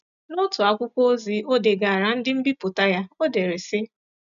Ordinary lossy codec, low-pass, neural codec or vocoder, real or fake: none; 7.2 kHz; none; real